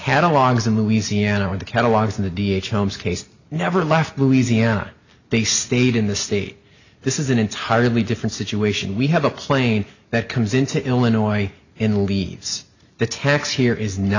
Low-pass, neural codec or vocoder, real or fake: 7.2 kHz; none; real